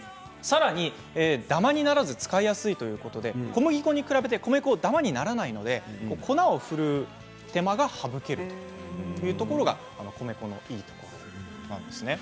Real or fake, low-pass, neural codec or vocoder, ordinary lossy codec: real; none; none; none